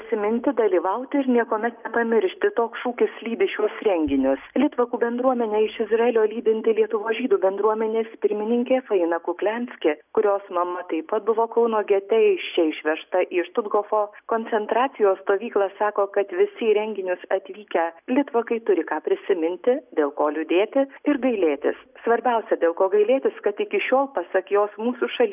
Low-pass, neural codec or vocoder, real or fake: 3.6 kHz; none; real